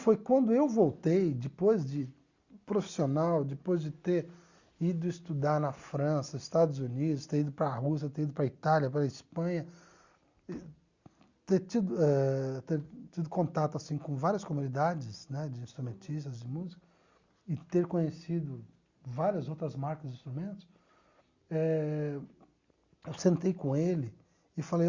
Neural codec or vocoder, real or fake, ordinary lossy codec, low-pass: none; real; Opus, 64 kbps; 7.2 kHz